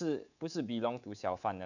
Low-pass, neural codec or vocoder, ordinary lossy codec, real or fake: 7.2 kHz; codec, 24 kHz, 3.1 kbps, DualCodec; none; fake